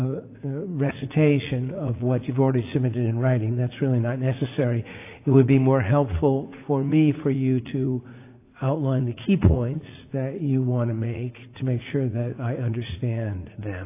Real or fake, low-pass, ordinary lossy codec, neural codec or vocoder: fake; 3.6 kHz; AAC, 24 kbps; vocoder, 44.1 kHz, 80 mel bands, Vocos